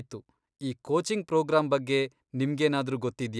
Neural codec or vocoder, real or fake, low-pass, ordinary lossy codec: none; real; none; none